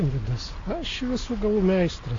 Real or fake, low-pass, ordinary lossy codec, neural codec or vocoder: real; 7.2 kHz; AAC, 32 kbps; none